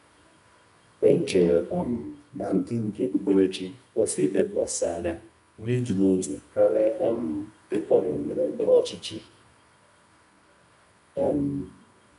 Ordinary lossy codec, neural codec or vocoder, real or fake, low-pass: none; codec, 24 kHz, 0.9 kbps, WavTokenizer, medium music audio release; fake; 10.8 kHz